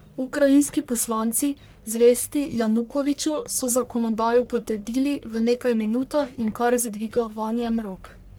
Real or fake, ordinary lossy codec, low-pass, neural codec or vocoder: fake; none; none; codec, 44.1 kHz, 1.7 kbps, Pupu-Codec